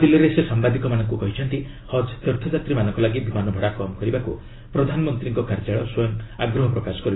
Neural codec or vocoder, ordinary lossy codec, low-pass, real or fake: none; AAC, 16 kbps; 7.2 kHz; real